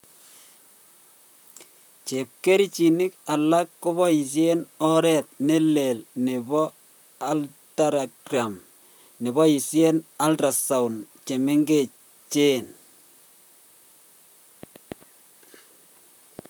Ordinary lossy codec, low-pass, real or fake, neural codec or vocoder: none; none; fake; vocoder, 44.1 kHz, 128 mel bands, Pupu-Vocoder